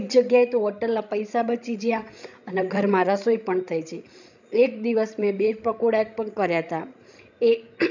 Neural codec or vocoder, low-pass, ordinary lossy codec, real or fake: codec, 16 kHz, 16 kbps, FreqCodec, larger model; 7.2 kHz; none; fake